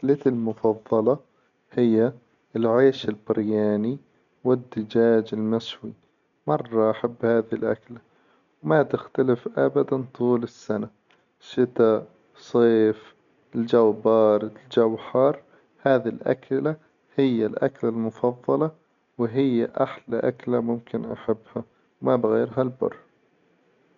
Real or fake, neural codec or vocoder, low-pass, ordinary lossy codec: real; none; 7.2 kHz; none